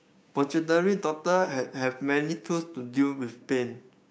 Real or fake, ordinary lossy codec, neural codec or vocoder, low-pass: fake; none; codec, 16 kHz, 6 kbps, DAC; none